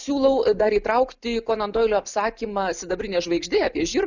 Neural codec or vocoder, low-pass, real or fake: none; 7.2 kHz; real